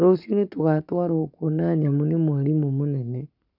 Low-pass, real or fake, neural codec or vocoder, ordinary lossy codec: 5.4 kHz; fake; codec, 44.1 kHz, 7.8 kbps, Pupu-Codec; none